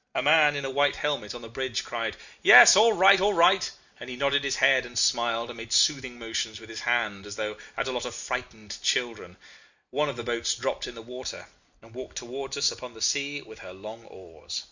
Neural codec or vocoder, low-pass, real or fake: none; 7.2 kHz; real